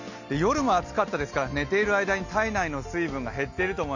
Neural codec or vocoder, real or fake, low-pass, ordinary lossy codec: none; real; 7.2 kHz; none